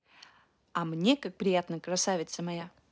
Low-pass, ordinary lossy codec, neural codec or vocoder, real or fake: none; none; none; real